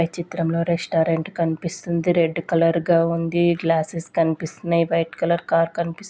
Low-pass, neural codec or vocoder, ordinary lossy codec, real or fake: none; none; none; real